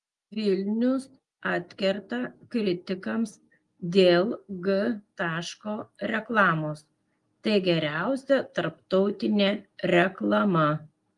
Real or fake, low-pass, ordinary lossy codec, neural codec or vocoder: real; 10.8 kHz; Opus, 32 kbps; none